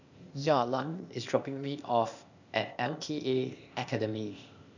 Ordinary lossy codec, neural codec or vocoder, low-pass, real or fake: none; codec, 16 kHz, 0.8 kbps, ZipCodec; 7.2 kHz; fake